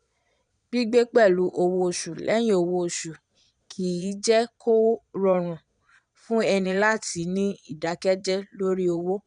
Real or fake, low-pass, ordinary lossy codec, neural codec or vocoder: fake; 9.9 kHz; none; vocoder, 22.05 kHz, 80 mel bands, Vocos